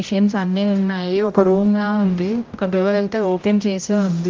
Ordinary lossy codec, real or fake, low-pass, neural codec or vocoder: Opus, 24 kbps; fake; 7.2 kHz; codec, 16 kHz, 0.5 kbps, X-Codec, HuBERT features, trained on general audio